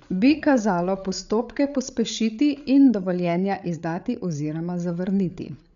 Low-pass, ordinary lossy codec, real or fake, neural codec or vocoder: 7.2 kHz; none; fake; codec, 16 kHz, 8 kbps, FreqCodec, larger model